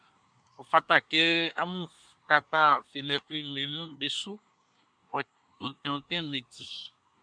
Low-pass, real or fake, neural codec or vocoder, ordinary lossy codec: 9.9 kHz; fake; codec, 24 kHz, 1 kbps, SNAC; MP3, 96 kbps